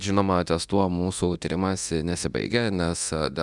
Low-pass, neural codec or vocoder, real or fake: 10.8 kHz; codec, 24 kHz, 0.9 kbps, DualCodec; fake